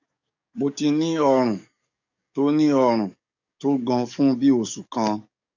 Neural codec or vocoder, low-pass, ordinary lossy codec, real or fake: codec, 44.1 kHz, 7.8 kbps, DAC; 7.2 kHz; none; fake